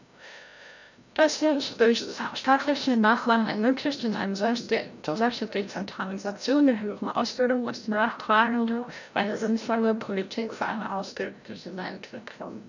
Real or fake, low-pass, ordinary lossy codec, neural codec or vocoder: fake; 7.2 kHz; none; codec, 16 kHz, 0.5 kbps, FreqCodec, larger model